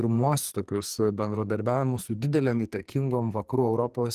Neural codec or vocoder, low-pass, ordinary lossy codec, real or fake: codec, 44.1 kHz, 2.6 kbps, SNAC; 14.4 kHz; Opus, 24 kbps; fake